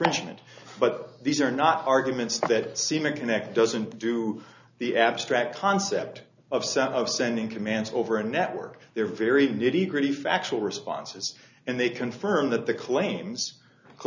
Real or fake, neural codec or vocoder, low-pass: real; none; 7.2 kHz